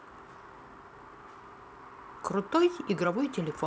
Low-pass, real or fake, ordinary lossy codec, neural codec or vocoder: none; real; none; none